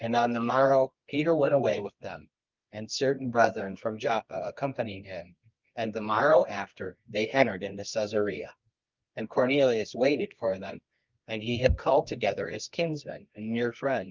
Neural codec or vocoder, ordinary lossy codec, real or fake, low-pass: codec, 24 kHz, 0.9 kbps, WavTokenizer, medium music audio release; Opus, 24 kbps; fake; 7.2 kHz